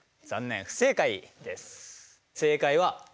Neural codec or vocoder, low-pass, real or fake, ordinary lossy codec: none; none; real; none